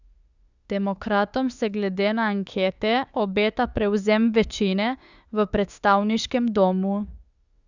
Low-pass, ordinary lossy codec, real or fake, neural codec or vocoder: 7.2 kHz; Opus, 64 kbps; fake; autoencoder, 48 kHz, 32 numbers a frame, DAC-VAE, trained on Japanese speech